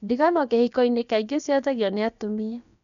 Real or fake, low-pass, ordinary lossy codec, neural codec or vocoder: fake; 7.2 kHz; none; codec, 16 kHz, about 1 kbps, DyCAST, with the encoder's durations